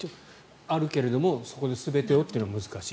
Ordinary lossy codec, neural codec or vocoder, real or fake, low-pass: none; none; real; none